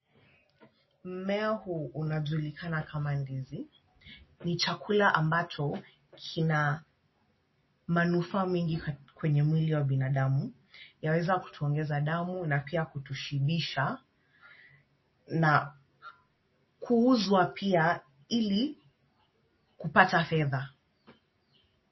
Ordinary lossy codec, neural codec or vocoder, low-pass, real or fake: MP3, 24 kbps; none; 7.2 kHz; real